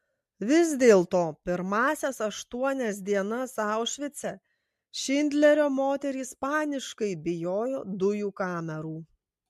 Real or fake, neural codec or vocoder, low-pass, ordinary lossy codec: real; none; 14.4 kHz; MP3, 64 kbps